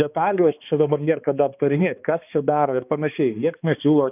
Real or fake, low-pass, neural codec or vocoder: fake; 3.6 kHz; codec, 16 kHz, 2 kbps, X-Codec, HuBERT features, trained on balanced general audio